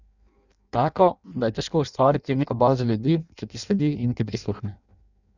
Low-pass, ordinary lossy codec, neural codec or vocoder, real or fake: 7.2 kHz; none; codec, 16 kHz in and 24 kHz out, 0.6 kbps, FireRedTTS-2 codec; fake